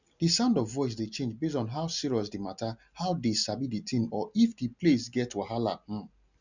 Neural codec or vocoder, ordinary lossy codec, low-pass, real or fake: none; none; 7.2 kHz; real